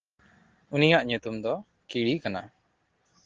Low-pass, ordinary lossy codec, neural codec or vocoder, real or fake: 7.2 kHz; Opus, 16 kbps; none; real